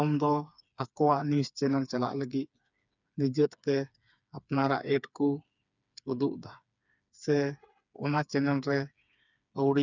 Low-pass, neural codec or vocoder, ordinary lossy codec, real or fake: 7.2 kHz; codec, 16 kHz, 4 kbps, FreqCodec, smaller model; none; fake